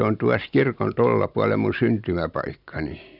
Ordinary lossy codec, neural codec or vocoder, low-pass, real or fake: none; none; 5.4 kHz; real